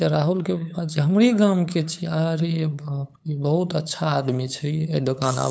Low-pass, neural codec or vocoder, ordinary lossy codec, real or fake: none; codec, 16 kHz, 8 kbps, FunCodec, trained on LibriTTS, 25 frames a second; none; fake